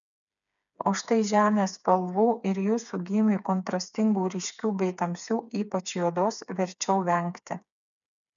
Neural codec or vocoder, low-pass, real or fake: codec, 16 kHz, 4 kbps, FreqCodec, smaller model; 7.2 kHz; fake